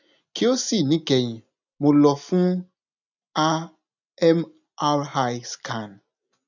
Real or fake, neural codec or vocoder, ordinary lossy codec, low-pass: real; none; none; 7.2 kHz